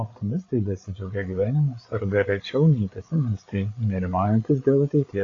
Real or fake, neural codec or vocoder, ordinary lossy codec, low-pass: fake; codec, 16 kHz, 8 kbps, FreqCodec, larger model; AAC, 32 kbps; 7.2 kHz